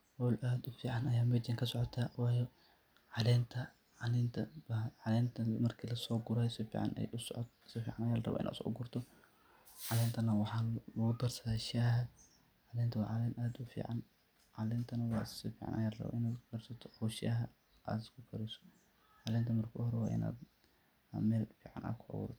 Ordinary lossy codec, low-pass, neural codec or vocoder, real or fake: none; none; none; real